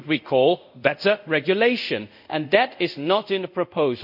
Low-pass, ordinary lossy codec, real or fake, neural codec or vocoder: 5.4 kHz; none; fake; codec, 24 kHz, 0.5 kbps, DualCodec